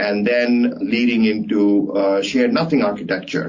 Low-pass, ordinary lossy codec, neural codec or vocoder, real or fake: 7.2 kHz; MP3, 64 kbps; none; real